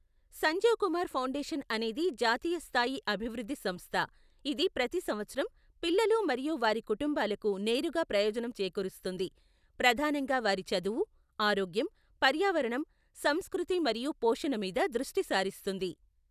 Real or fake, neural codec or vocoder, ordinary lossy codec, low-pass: real; none; none; 14.4 kHz